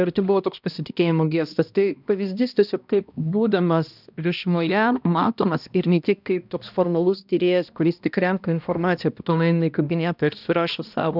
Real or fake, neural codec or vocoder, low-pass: fake; codec, 16 kHz, 1 kbps, X-Codec, HuBERT features, trained on balanced general audio; 5.4 kHz